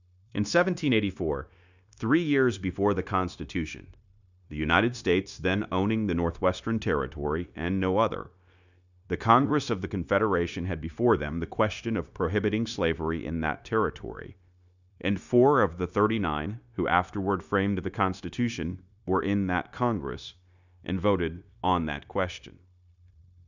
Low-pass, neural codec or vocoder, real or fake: 7.2 kHz; codec, 16 kHz, 0.9 kbps, LongCat-Audio-Codec; fake